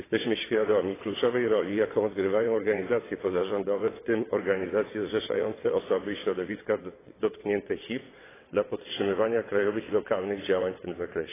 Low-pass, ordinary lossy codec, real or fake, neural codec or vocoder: 3.6 kHz; AAC, 16 kbps; fake; vocoder, 22.05 kHz, 80 mel bands, WaveNeXt